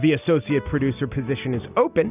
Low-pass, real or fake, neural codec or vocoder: 3.6 kHz; real; none